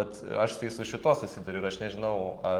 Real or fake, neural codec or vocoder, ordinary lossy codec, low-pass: fake; codec, 44.1 kHz, 7.8 kbps, Pupu-Codec; Opus, 24 kbps; 19.8 kHz